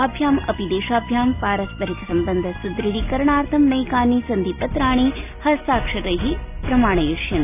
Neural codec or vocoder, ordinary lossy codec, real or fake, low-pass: none; none; real; 3.6 kHz